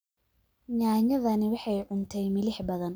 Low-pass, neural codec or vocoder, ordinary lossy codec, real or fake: none; none; none; real